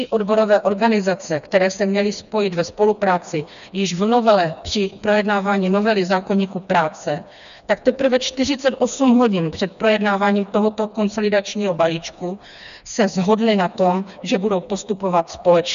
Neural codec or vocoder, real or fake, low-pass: codec, 16 kHz, 2 kbps, FreqCodec, smaller model; fake; 7.2 kHz